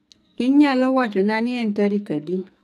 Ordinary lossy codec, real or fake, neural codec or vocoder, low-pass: none; fake; codec, 44.1 kHz, 2.6 kbps, SNAC; 14.4 kHz